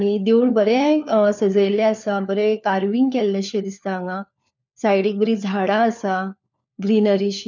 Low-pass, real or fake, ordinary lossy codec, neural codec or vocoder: 7.2 kHz; fake; none; codec, 16 kHz, 4 kbps, FunCodec, trained on LibriTTS, 50 frames a second